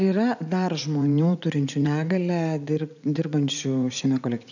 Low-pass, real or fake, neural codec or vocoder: 7.2 kHz; fake; vocoder, 44.1 kHz, 80 mel bands, Vocos